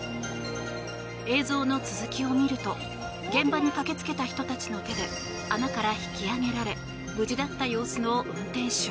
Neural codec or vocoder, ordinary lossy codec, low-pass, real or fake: none; none; none; real